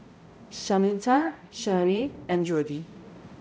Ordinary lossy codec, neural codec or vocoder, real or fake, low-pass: none; codec, 16 kHz, 0.5 kbps, X-Codec, HuBERT features, trained on balanced general audio; fake; none